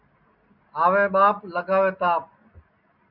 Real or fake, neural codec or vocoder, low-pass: real; none; 5.4 kHz